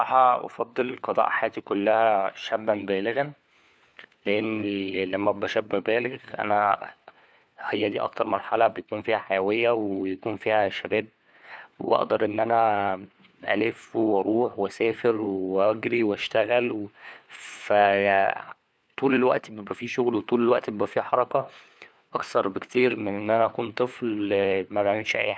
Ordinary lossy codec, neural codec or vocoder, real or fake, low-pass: none; codec, 16 kHz, 4 kbps, FunCodec, trained on LibriTTS, 50 frames a second; fake; none